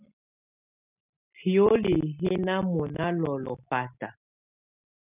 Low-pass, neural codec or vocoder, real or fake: 3.6 kHz; none; real